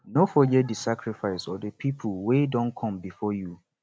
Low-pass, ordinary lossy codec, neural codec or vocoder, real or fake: none; none; none; real